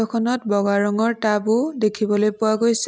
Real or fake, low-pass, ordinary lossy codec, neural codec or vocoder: real; none; none; none